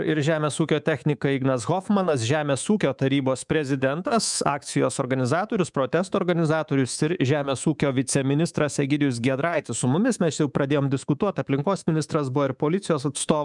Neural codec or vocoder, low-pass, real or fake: codec, 24 kHz, 3.1 kbps, DualCodec; 10.8 kHz; fake